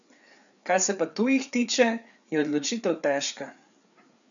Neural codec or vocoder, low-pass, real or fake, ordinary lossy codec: codec, 16 kHz, 4 kbps, FreqCodec, larger model; 7.2 kHz; fake; none